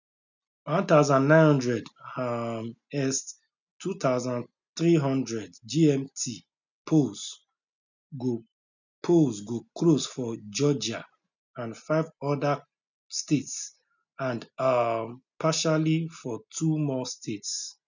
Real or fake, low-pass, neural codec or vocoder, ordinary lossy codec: real; 7.2 kHz; none; none